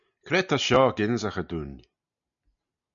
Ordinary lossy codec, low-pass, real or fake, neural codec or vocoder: MP3, 96 kbps; 7.2 kHz; real; none